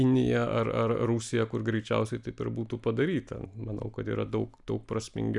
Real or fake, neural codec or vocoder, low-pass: real; none; 10.8 kHz